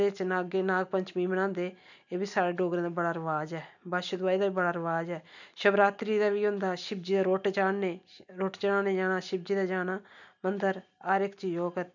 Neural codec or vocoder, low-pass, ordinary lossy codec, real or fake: none; 7.2 kHz; none; real